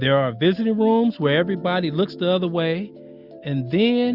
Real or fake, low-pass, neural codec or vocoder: real; 5.4 kHz; none